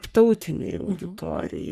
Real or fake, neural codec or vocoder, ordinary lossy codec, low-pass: fake; codec, 44.1 kHz, 3.4 kbps, Pupu-Codec; Opus, 64 kbps; 14.4 kHz